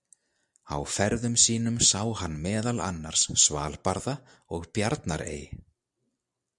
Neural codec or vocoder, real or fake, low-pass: none; real; 10.8 kHz